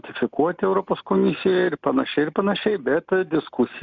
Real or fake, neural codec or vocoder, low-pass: real; none; 7.2 kHz